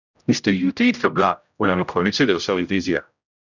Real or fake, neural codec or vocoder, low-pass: fake; codec, 16 kHz, 0.5 kbps, X-Codec, HuBERT features, trained on general audio; 7.2 kHz